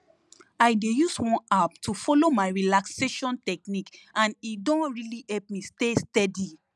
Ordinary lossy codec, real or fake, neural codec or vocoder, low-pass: none; real; none; none